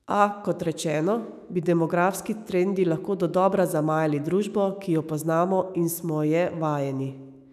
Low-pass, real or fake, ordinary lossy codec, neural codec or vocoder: 14.4 kHz; fake; none; autoencoder, 48 kHz, 128 numbers a frame, DAC-VAE, trained on Japanese speech